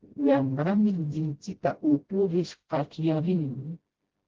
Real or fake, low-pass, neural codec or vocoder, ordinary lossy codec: fake; 7.2 kHz; codec, 16 kHz, 0.5 kbps, FreqCodec, smaller model; Opus, 16 kbps